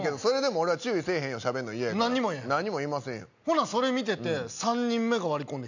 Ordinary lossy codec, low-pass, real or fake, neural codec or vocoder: none; 7.2 kHz; real; none